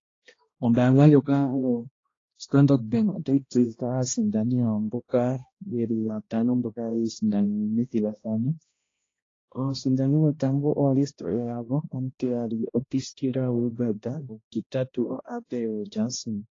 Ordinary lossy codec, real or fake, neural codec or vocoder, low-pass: AAC, 32 kbps; fake; codec, 16 kHz, 1 kbps, X-Codec, HuBERT features, trained on balanced general audio; 7.2 kHz